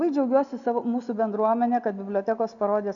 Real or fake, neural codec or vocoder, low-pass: real; none; 7.2 kHz